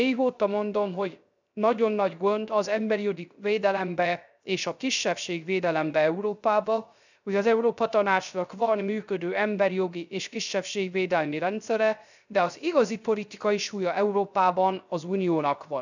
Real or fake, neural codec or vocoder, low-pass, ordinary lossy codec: fake; codec, 16 kHz, 0.3 kbps, FocalCodec; 7.2 kHz; none